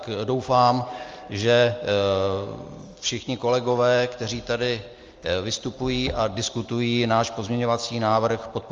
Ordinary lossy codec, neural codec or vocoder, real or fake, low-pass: Opus, 32 kbps; none; real; 7.2 kHz